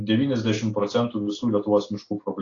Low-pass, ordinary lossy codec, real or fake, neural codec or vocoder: 7.2 kHz; AAC, 32 kbps; real; none